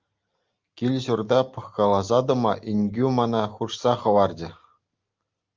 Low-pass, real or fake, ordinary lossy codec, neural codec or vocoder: 7.2 kHz; real; Opus, 24 kbps; none